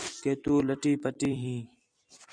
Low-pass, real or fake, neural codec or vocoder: 9.9 kHz; fake; vocoder, 44.1 kHz, 128 mel bands every 256 samples, BigVGAN v2